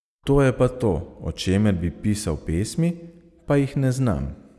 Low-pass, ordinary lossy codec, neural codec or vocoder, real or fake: none; none; none; real